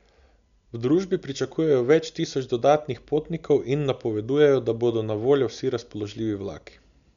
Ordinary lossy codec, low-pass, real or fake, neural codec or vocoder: none; 7.2 kHz; real; none